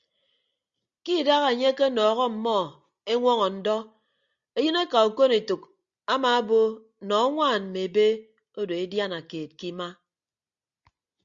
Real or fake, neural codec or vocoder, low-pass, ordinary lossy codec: real; none; 7.2 kHz; Opus, 64 kbps